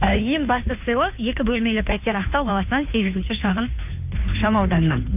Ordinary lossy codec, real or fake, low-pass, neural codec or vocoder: none; fake; 3.6 kHz; codec, 16 kHz, 2 kbps, FunCodec, trained on Chinese and English, 25 frames a second